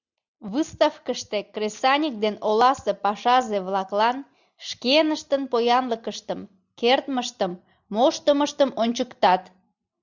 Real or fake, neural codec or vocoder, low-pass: real; none; 7.2 kHz